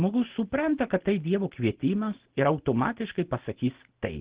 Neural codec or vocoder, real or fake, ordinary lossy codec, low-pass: none; real; Opus, 16 kbps; 3.6 kHz